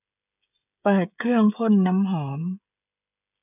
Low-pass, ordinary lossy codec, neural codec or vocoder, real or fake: 3.6 kHz; none; codec, 16 kHz, 16 kbps, FreqCodec, smaller model; fake